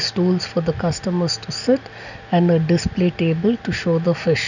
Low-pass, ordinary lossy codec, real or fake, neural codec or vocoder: 7.2 kHz; none; real; none